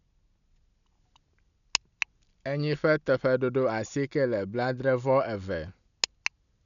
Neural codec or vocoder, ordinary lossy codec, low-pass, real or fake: none; none; 7.2 kHz; real